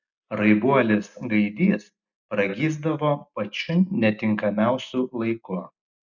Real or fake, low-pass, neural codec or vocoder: real; 7.2 kHz; none